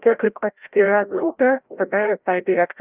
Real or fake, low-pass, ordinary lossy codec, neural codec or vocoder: fake; 3.6 kHz; Opus, 32 kbps; codec, 16 kHz, 0.5 kbps, FreqCodec, larger model